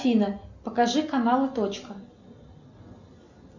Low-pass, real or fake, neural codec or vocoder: 7.2 kHz; real; none